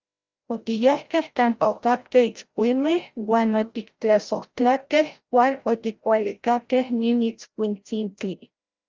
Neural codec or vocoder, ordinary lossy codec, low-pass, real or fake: codec, 16 kHz, 0.5 kbps, FreqCodec, larger model; Opus, 32 kbps; 7.2 kHz; fake